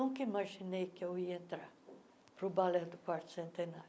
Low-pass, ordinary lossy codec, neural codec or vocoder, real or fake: none; none; none; real